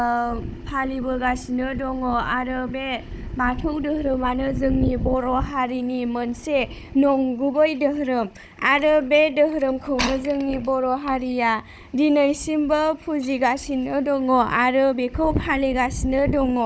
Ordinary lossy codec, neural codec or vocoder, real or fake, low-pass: none; codec, 16 kHz, 16 kbps, FunCodec, trained on Chinese and English, 50 frames a second; fake; none